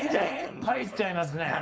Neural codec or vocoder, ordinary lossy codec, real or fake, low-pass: codec, 16 kHz, 4.8 kbps, FACodec; none; fake; none